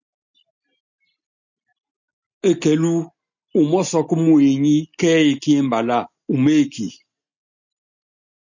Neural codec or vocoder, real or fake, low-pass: none; real; 7.2 kHz